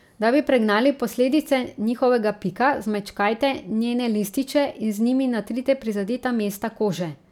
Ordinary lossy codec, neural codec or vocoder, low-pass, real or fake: none; none; 19.8 kHz; real